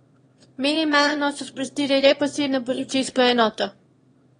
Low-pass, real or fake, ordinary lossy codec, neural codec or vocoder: 9.9 kHz; fake; AAC, 32 kbps; autoencoder, 22.05 kHz, a latent of 192 numbers a frame, VITS, trained on one speaker